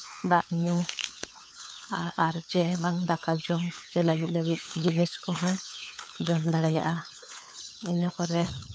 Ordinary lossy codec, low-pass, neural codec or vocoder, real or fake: none; none; codec, 16 kHz, 4 kbps, FunCodec, trained on LibriTTS, 50 frames a second; fake